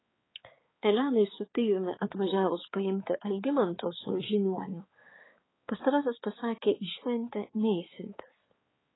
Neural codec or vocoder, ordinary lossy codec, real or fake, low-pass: codec, 16 kHz, 4 kbps, X-Codec, HuBERT features, trained on balanced general audio; AAC, 16 kbps; fake; 7.2 kHz